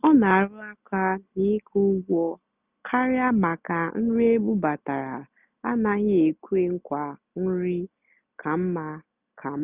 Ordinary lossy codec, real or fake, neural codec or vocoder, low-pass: none; real; none; 3.6 kHz